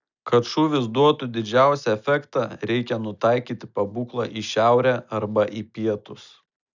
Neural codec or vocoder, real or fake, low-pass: none; real; 7.2 kHz